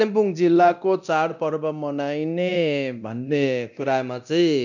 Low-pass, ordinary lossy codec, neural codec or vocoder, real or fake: 7.2 kHz; none; codec, 24 kHz, 0.9 kbps, DualCodec; fake